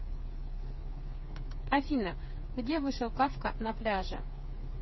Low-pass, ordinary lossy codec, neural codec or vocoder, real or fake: 7.2 kHz; MP3, 24 kbps; codec, 16 kHz, 4 kbps, FreqCodec, smaller model; fake